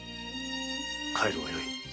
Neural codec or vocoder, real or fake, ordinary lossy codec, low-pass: none; real; none; none